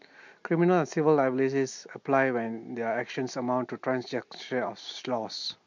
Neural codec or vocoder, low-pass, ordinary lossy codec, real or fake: none; 7.2 kHz; MP3, 48 kbps; real